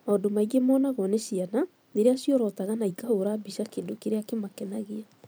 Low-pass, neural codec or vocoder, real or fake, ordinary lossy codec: none; vocoder, 44.1 kHz, 128 mel bands every 256 samples, BigVGAN v2; fake; none